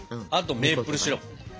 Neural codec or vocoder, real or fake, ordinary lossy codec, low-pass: none; real; none; none